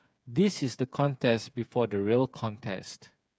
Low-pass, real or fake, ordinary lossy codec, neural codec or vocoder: none; fake; none; codec, 16 kHz, 8 kbps, FreqCodec, smaller model